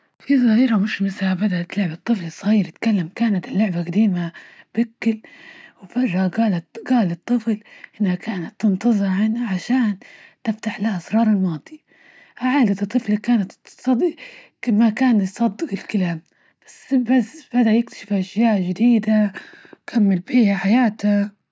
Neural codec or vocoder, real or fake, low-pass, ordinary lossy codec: none; real; none; none